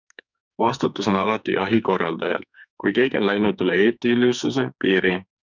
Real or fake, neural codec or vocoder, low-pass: fake; codec, 44.1 kHz, 2.6 kbps, SNAC; 7.2 kHz